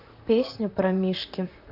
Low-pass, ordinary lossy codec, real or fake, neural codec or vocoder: 5.4 kHz; AAC, 48 kbps; fake; vocoder, 44.1 kHz, 128 mel bands, Pupu-Vocoder